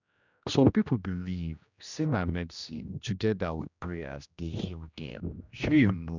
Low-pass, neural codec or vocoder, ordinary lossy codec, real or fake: 7.2 kHz; codec, 16 kHz, 1 kbps, X-Codec, HuBERT features, trained on general audio; none; fake